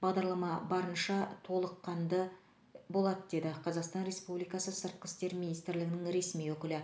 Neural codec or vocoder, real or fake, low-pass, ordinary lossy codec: none; real; none; none